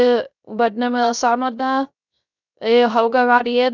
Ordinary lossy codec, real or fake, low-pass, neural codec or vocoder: none; fake; 7.2 kHz; codec, 16 kHz, 0.3 kbps, FocalCodec